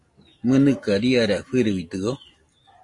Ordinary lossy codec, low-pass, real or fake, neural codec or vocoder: AAC, 48 kbps; 10.8 kHz; real; none